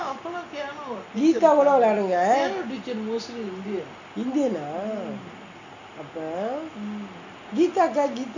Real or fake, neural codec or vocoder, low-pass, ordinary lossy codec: real; none; 7.2 kHz; none